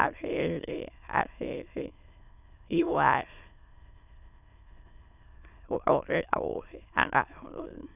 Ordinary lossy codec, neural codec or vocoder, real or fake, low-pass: none; autoencoder, 22.05 kHz, a latent of 192 numbers a frame, VITS, trained on many speakers; fake; 3.6 kHz